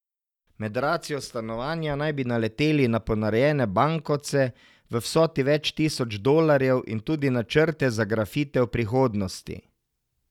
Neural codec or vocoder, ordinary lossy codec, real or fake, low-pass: none; none; real; 19.8 kHz